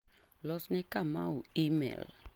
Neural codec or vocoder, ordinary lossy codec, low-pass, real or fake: none; Opus, 24 kbps; 19.8 kHz; real